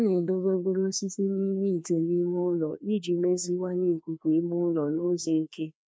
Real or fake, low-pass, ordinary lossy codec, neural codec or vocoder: fake; none; none; codec, 16 kHz, 2 kbps, FreqCodec, larger model